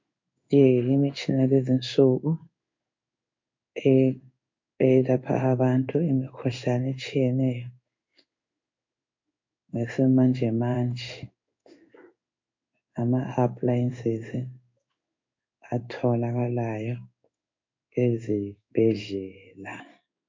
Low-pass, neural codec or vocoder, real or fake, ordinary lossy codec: 7.2 kHz; codec, 16 kHz in and 24 kHz out, 1 kbps, XY-Tokenizer; fake; MP3, 48 kbps